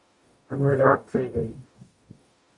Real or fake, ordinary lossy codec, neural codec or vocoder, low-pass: fake; MP3, 64 kbps; codec, 44.1 kHz, 0.9 kbps, DAC; 10.8 kHz